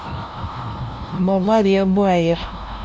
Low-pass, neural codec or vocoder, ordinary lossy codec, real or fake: none; codec, 16 kHz, 0.5 kbps, FunCodec, trained on LibriTTS, 25 frames a second; none; fake